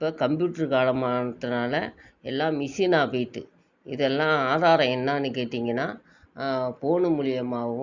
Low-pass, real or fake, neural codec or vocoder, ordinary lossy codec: 7.2 kHz; real; none; Opus, 64 kbps